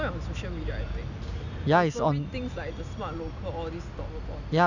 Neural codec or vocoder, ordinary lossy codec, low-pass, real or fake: none; none; 7.2 kHz; real